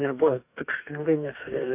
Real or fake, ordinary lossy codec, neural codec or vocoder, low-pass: fake; AAC, 32 kbps; codec, 44.1 kHz, 2.6 kbps, DAC; 3.6 kHz